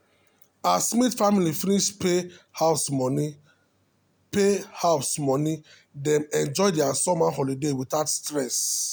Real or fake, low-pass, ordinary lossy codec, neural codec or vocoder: real; none; none; none